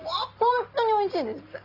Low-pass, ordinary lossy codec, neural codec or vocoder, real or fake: 5.4 kHz; Opus, 24 kbps; none; real